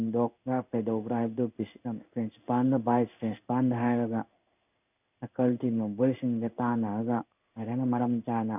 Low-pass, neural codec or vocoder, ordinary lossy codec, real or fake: 3.6 kHz; codec, 16 kHz in and 24 kHz out, 1 kbps, XY-Tokenizer; none; fake